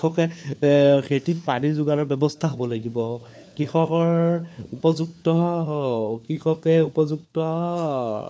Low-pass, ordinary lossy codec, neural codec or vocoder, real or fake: none; none; codec, 16 kHz, 4 kbps, FunCodec, trained on LibriTTS, 50 frames a second; fake